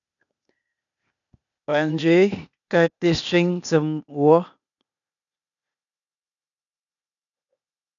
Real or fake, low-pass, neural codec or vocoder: fake; 7.2 kHz; codec, 16 kHz, 0.8 kbps, ZipCodec